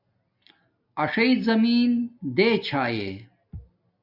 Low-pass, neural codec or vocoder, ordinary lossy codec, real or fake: 5.4 kHz; none; AAC, 48 kbps; real